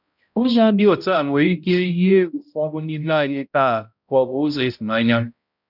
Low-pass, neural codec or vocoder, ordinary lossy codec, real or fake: 5.4 kHz; codec, 16 kHz, 0.5 kbps, X-Codec, HuBERT features, trained on balanced general audio; none; fake